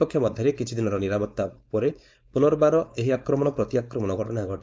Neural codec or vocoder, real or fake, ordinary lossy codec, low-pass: codec, 16 kHz, 4.8 kbps, FACodec; fake; none; none